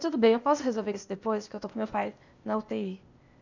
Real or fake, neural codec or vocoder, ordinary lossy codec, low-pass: fake; codec, 16 kHz, 0.8 kbps, ZipCodec; none; 7.2 kHz